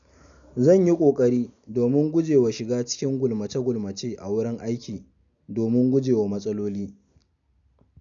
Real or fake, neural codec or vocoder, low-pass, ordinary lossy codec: real; none; 7.2 kHz; none